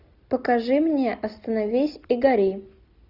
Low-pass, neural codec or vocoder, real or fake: 5.4 kHz; none; real